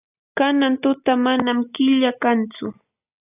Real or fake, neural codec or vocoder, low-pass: real; none; 3.6 kHz